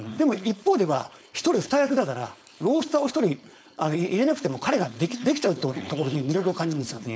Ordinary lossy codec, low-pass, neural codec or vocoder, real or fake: none; none; codec, 16 kHz, 4.8 kbps, FACodec; fake